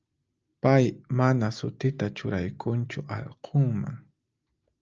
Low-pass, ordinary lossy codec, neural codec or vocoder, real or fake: 7.2 kHz; Opus, 24 kbps; none; real